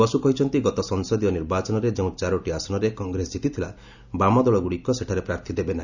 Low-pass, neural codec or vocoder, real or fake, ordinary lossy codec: 7.2 kHz; none; real; none